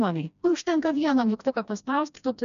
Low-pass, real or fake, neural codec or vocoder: 7.2 kHz; fake; codec, 16 kHz, 1 kbps, FreqCodec, smaller model